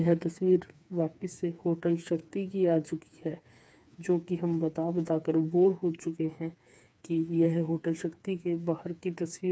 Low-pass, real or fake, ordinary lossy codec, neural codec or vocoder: none; fake; none; codec, 16 kHz, 4 kbps, FreqCodec, smaller model